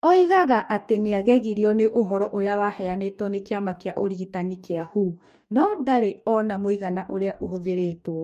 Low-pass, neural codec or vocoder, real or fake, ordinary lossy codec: 14.4 kHz; codec, 44.1 kHz, 2.6 kbps, DAC; fake; MP3, 64 kbps